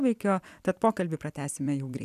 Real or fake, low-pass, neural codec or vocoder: fake; 14.4 kHz; vocoder, 44.1 kHz, 128 mel bands every 256 samples, BigVGAN v2